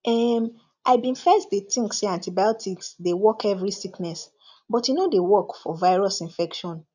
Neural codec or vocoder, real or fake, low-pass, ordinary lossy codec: none; real; 7.2 kHz; none